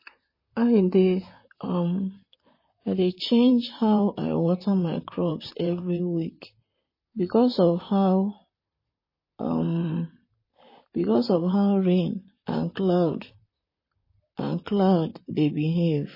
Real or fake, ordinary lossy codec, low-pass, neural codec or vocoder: fake; MP3, 24 kbps; 5.4 kHz; vocoder, 44.1 kHz, 128 mel bands, Pupu-Vocoder